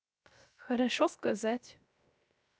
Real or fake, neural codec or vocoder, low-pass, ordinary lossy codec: fake; codec, 16 kHz, 0.3 kbps, FocalCodec; none; none